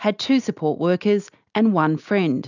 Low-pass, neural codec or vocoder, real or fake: 7.2 kHz; none; real